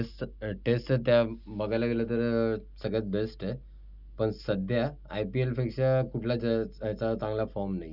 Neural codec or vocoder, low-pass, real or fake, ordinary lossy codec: none; 5.4 kHz; real; none